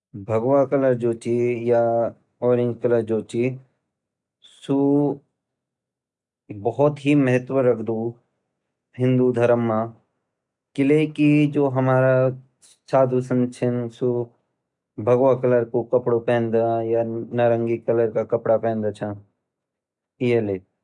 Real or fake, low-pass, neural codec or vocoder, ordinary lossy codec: real; 10.8 kHz; none; none